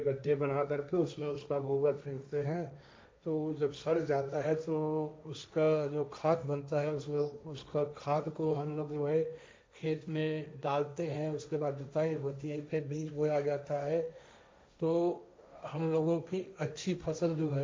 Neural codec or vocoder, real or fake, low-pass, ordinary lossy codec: codec, 16 kHz, 1.1 kbps, Voila-Tokenizer; fake; none; none